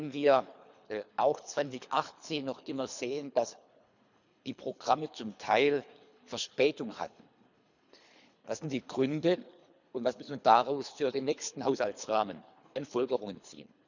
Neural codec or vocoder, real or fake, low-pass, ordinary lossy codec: codec, 24 kHz, 3 kbps, HILCodec; fake; 7.2 kHz; none